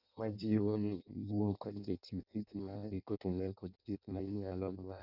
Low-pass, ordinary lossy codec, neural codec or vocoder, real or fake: 5.4 kHz; none; codec, 16 kHz in and 24 kHz out, 0.6 kbps, FireRedTTS-2 codec; fake